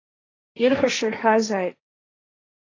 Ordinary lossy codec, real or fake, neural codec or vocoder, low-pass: AAC, 32 kbps; fake; codec, 16 kHz, 1.1 kbps, Voila-Tokenizer; 7.2 kHz